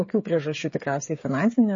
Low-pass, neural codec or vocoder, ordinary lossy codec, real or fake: 7.2 kHz; codec, 16 kHz, 8 kbps, FreqCodec, smaller model; MP3, 32 kbps; fake